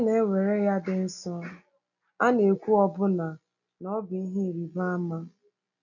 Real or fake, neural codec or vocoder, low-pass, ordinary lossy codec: real; none; 7.2 kHz; none